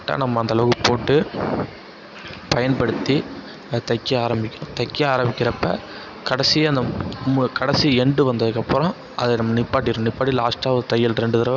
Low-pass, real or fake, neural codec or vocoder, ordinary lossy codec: 7.2 kHz; real; none; none